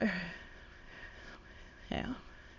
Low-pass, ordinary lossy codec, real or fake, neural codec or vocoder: 7.2 kHz; none; fake; autoencoder, 22.05 kHz, a latent of 192 numbers a frame, VITS, trained on many speakers